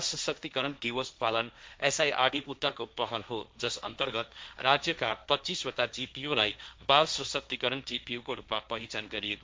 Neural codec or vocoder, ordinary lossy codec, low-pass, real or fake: codec, 16 kHz, 1.1 kbps, Voila-Tokenizer; none; none; fake